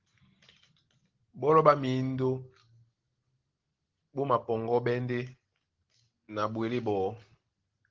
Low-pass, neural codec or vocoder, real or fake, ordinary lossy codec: 7.2 kHz; none; real; Opus, 16 kbps